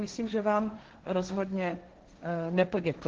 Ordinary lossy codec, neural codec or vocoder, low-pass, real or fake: Opus, 16 kbps; codec, 16 kHz, 1.1 kbps, Voila-Tokenizer; 7.2 kHz; fake